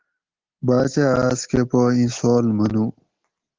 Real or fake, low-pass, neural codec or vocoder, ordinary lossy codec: real; 7.2 kHz; none; Opus, 16 kbps